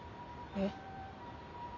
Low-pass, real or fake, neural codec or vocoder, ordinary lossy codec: 7.2 kHz; real; none; none